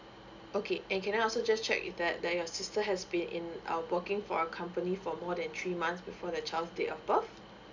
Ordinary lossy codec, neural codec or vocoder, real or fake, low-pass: none; none; real; 7.2 kHz